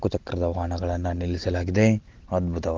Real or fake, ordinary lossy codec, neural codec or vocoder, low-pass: real; Opus, 16 kbps; none; 7.2 kHz